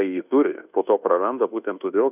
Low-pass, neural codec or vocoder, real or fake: 3.6 kHz; codec, 24 kHz, 1.2 kbps, DualCodec; fake